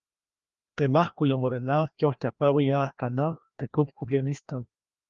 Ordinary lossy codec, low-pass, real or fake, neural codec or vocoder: Opus, 32 kbps; 7.2 kHz; fake; codec, 16 kHz, 1 kbps, FreqCodec, larger model